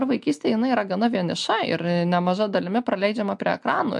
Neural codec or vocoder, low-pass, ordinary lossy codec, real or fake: none; 10.8 kHz; MP3, 64 kbps; real